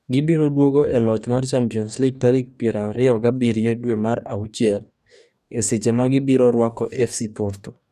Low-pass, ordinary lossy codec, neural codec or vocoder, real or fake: 14.4 kHz; none; codec, 44.1 kHz, 2.6 kbps, DAC; fake